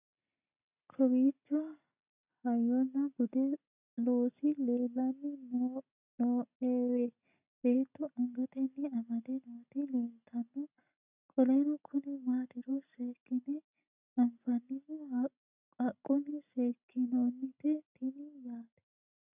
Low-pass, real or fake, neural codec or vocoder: 3.6 kHz; real; none